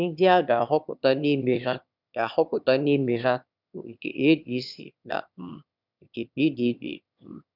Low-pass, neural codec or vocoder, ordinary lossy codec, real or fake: 5.4 kHz; autoencoder, 22.05 kHz, a latent of 192 numbers a frame, VITS, trained on one speaker; none; fake